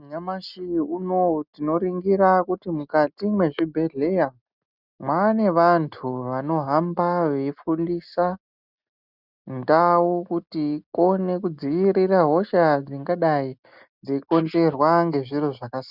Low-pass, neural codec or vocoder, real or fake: 5.4 kHz; none; real